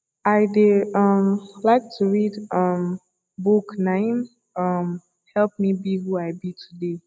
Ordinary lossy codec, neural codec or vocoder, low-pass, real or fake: none; none; none; real